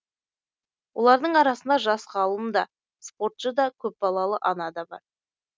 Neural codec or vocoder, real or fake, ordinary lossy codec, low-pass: none; real; none; none